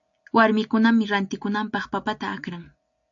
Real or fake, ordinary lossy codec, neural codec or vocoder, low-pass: real; AAC, 64 kbps; none; 7.2 kHz